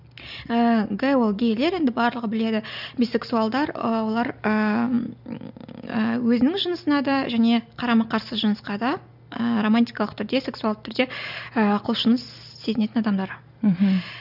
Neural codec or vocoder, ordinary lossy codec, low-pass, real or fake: none; none; 5.4 kHz; real